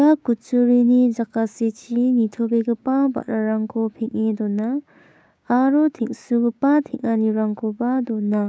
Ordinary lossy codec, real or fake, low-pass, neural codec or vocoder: none; fake; none; codec, 16 kHz, 6 kbps, DAC